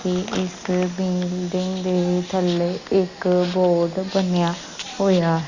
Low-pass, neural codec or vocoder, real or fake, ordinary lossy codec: 7.2 kHz; none; real; Opus, 64 kbps